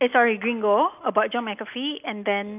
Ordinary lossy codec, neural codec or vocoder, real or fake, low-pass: none; none; real; 3.6 kHz